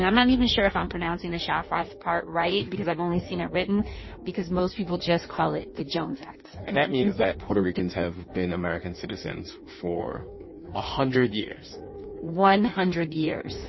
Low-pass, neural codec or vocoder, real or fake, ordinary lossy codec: 7.2 kHz; codec, 16 kHz in and 24 kHz out, 1.1 kbps, FireRedTTS-2 codec; fake; MP3, 24 kbps